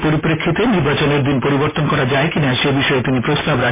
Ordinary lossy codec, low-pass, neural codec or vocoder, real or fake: MP3, 16 kbps; 3.6 kHz; none; real